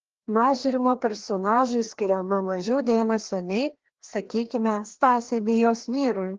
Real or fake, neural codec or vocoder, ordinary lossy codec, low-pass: fake; codec, 16 kHz, 1 kbps, FreqCodec, larger model; Opus, 16 kbps; 7.2 kHz